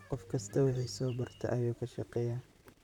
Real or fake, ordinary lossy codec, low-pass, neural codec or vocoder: fake; none; 19.8 kHz; vocoder, 44.1 kHz, 128 mel bands, Pupu-Vocoder